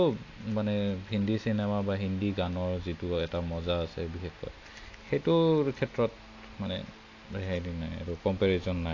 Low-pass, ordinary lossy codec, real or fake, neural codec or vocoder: 7.2 kHz; AAC, 48 kbps; real; none